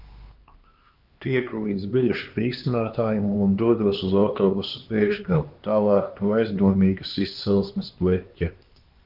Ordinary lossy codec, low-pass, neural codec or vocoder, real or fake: Opus, 32 kbps; 5.4 kHz; codec, 16 kHz, 2 kbps, X-Codec, HuBERT features, trained on LibriSpeech; fake